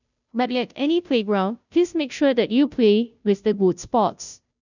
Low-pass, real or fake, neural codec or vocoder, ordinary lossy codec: 7.2 kHz; fake; codec, 16 kHz, 0.5 kbps, FunCodec, trained on Chinese and English, 25 frames a second; none